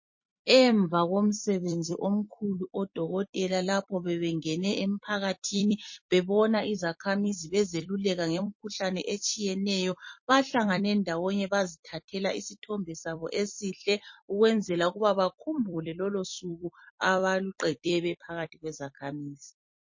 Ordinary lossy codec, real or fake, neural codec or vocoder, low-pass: MP3, 32 kbps; fake; vocoder, 44.1 kHz, 128 mel bands every 256 samples, BigVGAN v2; 7.2 kHz